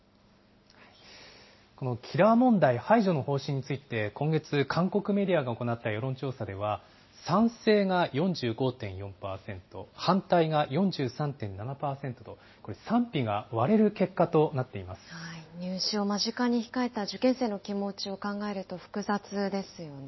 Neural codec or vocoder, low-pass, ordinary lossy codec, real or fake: none; 7.2 kHz; MP3, 24 kbps; real